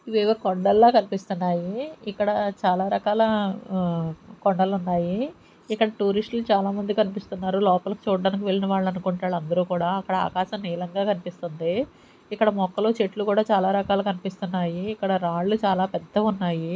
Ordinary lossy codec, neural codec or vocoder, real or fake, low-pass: none; none; real; none